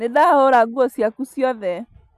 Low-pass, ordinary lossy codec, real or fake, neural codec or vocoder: 14.4 kHz; none; real; none